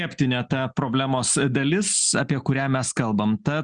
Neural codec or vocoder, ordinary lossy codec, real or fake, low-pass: none; Opus, 64 kbps; real; 9.9 kHz